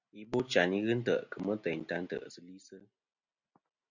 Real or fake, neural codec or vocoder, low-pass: real; none; 7.2 kHz